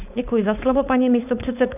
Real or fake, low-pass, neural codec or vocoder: fake; 3.6 kHz; codec, 16 kHz, 4.8 kbps, FACodec